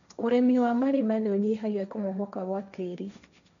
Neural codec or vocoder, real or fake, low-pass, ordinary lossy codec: codec, 16 kHz, 1.1 kbps, Voila-Tokenizer; fake; 7.2 kHz; none